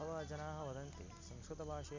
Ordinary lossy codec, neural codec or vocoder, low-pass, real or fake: MP3, 64 kbps; none; 7.2 kHz; real